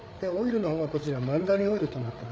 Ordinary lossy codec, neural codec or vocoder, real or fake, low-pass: none; codec, 16 kHz, 8 kbps, FreqCodec, larger model; fake; none